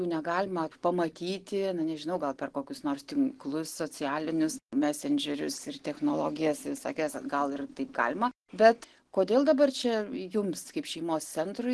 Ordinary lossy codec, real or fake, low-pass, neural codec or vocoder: Opus, 16 kbps; real; 10.8 kHz; none